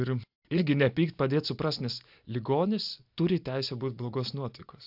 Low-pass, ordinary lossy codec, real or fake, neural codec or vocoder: 5.4 kHz; AAC, 48 kbps; real; none